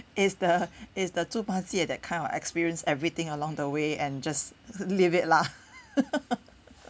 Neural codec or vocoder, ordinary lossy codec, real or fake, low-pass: none; none; real; none